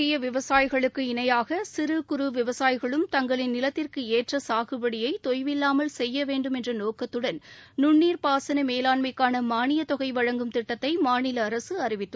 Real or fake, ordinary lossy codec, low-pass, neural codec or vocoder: real; none; none; none